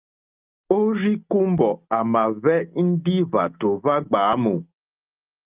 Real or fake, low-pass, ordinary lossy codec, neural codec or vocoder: real; 3.6 kHz; Opus, 32 kbps; none